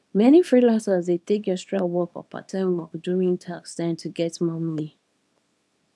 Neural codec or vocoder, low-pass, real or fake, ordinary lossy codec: codec, 24 kHz, 0.9 kbps, WavTokenizer, small release; none; fake; none